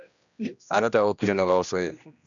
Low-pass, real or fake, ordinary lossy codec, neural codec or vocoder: 7.2 kHz; fake; MP3, 96 kbps; codec, 16 kHz, 1 kbps, X-Codec, HuBERT features, trained on general audio